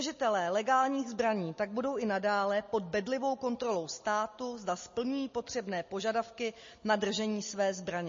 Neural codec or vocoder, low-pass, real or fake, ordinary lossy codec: none; 7.2 kHz; real; MP3, 32 kbps